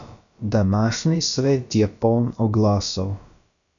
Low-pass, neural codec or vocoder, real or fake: 7.2 kHz; codec, 16 kHz, about 1 kbps, DyCAST, with the encoder's durations; fake